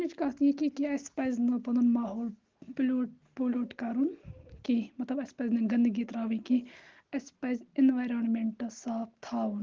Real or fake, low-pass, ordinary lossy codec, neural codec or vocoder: real; 7.2 kHz; Opus, 16 kbps; none